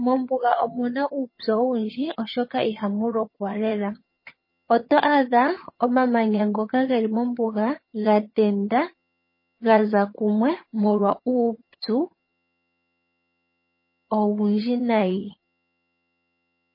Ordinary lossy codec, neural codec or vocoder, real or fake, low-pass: MP3, 24 kbps; vocoder, 22.05 kHz, 80 mel bands, HiFi-GAN; fake; 5.4 kHz